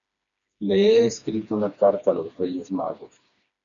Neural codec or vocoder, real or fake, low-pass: codec, 16 kHz, 2 kbps, FreqCodec, smaller model; fake; 7.2 kHz